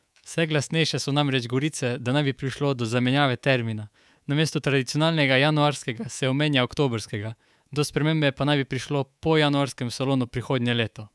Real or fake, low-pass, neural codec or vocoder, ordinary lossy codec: fake; none; codec, 24 kHz, 3.1 kbps, DualCodec; none